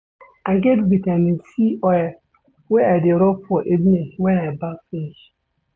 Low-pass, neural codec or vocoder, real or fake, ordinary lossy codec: none; none; real; none